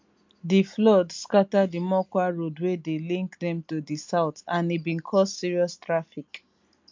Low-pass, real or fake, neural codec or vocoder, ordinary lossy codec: 7.2 kHz; real; none; MP3, 64 kbps